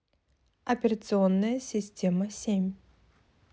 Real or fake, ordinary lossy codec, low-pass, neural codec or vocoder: real; none; none; none